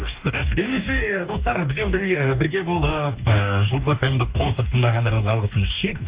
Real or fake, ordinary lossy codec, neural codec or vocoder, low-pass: fake; Opus, 64 kbps; codec, 44.1 kHz, 2.6 kbps, DAC; 3.6 kHz